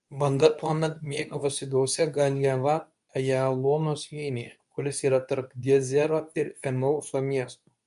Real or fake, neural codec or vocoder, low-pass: fake; codec, 24 kHz, 0.9 kbps, WavTokenizer, medium speech release version 2; 10.8 kHz